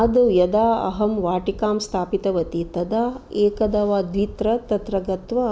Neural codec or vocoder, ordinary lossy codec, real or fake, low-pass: none; none; real; none